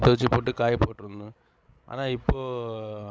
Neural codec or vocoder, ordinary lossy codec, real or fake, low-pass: codec, 16 kHz, 16 kbps, FreqCodec, larger model; none; fake; none